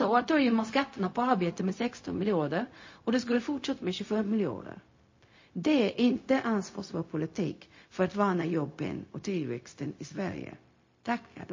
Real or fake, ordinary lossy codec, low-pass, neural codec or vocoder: fake; MP3, 32 kbps; 7.2 kHz; codec, 16 kHz, 0.4 kbps, LongCat-Audio-Codec